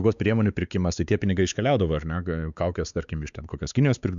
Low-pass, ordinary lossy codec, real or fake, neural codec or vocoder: 7.2 kHz; Opus, 64 kbps; fake; codec, 16 kHz, 4 kbps, X-Codec, WavLM features, trained on Multilingual LibriSpeech